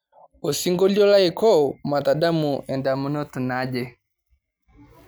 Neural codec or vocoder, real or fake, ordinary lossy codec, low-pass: none; real; none; none